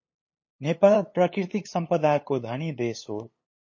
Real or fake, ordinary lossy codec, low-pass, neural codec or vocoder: fake; MP3, 32 kbps; 7.2 kHz; codec, 16 kHz, 8 kbps, FunCodec, trained on LibriTTS, 25 frames a second